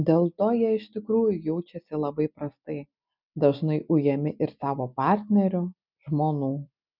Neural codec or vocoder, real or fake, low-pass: none; real; 5.4 kHz